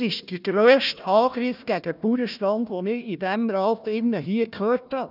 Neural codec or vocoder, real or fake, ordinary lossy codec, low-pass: codec, 16 kHz, 1 kbps, FunCodec, trained on Chinese and English, 50 frames a second; fake; none; 5.4 kHz